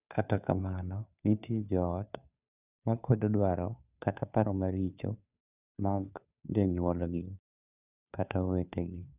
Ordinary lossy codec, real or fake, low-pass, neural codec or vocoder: none; fake; 3.6 kHz; codec, 16 kHz, 2 kbps, FunCodec, trained on Chinese and English, 25 frames a second